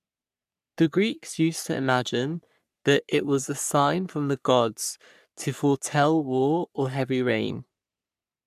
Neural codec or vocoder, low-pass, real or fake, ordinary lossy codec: codec, 44.1 kHz, 3.4 kbps, Pupu-Codec; 14.4 kHz; fake; none